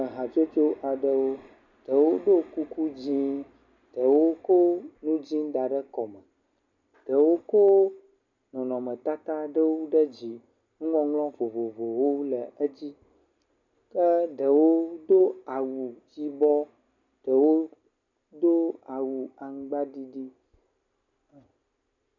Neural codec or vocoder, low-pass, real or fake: none; 7.2 kHz; real